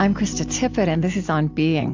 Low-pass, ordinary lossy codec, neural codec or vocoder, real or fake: 7.2 kHz; AAC, 48 kbps; none; real